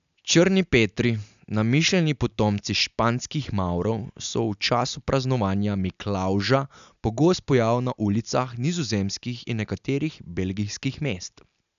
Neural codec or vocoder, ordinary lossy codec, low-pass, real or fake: none; none; 7.2 kHz; real